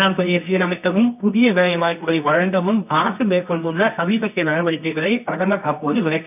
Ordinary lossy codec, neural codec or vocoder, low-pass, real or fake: none; codec, 24 kHz, 0.9 kbps, WavTokenizer, medium music audio release; 3.6 kHz; fake